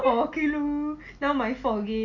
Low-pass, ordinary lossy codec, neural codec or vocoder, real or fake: 7.2 kHz; none; none; real